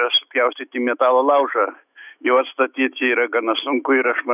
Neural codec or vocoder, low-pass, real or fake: autoencoder, 48 kHz, 128 numbers a frame, DAC-VAE, trained on Japanese speech; 3.6 kHz; fake